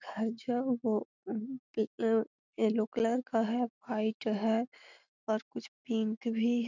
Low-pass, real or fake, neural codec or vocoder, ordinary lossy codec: 7.2 kHz; real; none; none